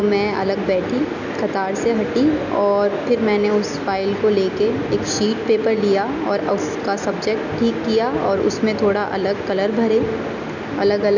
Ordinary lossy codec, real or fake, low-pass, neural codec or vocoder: none; real; 7.2 kHz; none